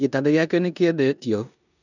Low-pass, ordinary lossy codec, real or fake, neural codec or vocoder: 7.2 kHz; none; fake; codec, 16 kHz in and 24 kHz out, 0.9 kbps, LongCat-Audio-Codec, four codebook decoder